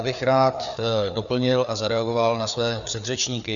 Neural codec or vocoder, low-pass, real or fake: codec, 16 kHz, 4 kbps, FreqCodec, larger model; 7.2 kHz; fake